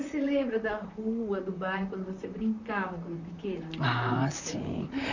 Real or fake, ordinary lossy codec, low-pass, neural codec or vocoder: fake; none; 7.2 kHz; vocoder, 44.1 kHz, 128 mel bands, Pupu-Vocoder